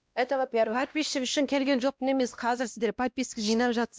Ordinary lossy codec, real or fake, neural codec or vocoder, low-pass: none; fake; codec, 16 kHz, 1 kbps, X-Codec, WavLM features, trained on Multilingual LibriSpeech; none